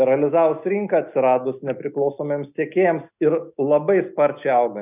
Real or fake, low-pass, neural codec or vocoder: real; 3.6 kHz; none